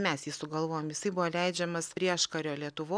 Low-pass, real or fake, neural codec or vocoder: 9.9 kHz; real; none